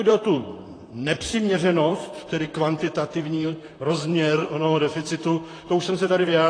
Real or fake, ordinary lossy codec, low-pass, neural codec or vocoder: fake; AAC, 32 kbps; 9.9 kHz; vocoder, 44.1 kHz, 128 mel bands, Pupu-Vocoder